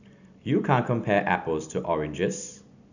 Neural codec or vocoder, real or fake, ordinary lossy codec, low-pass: none; real; none; 7.2 kHz